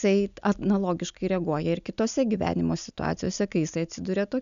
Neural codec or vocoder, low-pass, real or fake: none; 7.2 kHz; real